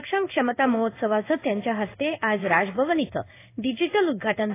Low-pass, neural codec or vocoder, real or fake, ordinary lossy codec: 3.6 kHz; codec, 16 kHz in and 24 kHz out, 1 kbps, XY-Tokenizer; fake; AAC, 24 kbps